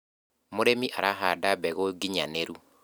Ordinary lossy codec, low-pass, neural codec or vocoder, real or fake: none; none; none; real